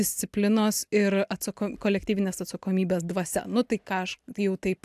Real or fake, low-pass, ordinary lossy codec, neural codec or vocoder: real; 14.4 kHz; AAC, 96 kbps; none